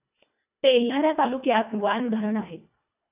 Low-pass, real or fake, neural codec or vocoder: 3.6 kHz; fake; codec, 24 kHz, 1.5 kbps, HILCodec